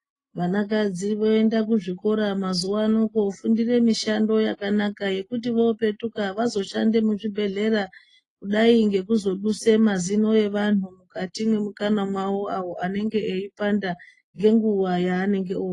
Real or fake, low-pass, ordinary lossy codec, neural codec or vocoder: real; 10.8 kHz; AAC, 32 kbps; none